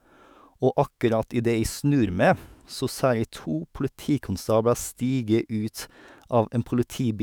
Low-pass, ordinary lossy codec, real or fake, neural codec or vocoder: none; none; fake; autoencoder, 48 kHz, 128 numbers a frame, DAC-VAE, trained on Japanese speech